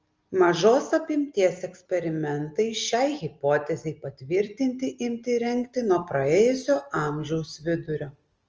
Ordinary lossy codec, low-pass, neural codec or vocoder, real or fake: Opus, 24 kbps; 7.2 kHz; none; real